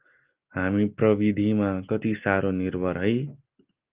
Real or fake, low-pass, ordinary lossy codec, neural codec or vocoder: real; 3.6 kHz; Opus, 32 kbps; none